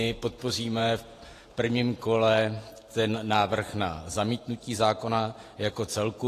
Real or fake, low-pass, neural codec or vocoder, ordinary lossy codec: fake; 14.4 kHz; vocoder, 48 kHz, 128 mel bands, Vocos; AAC, 48 kbps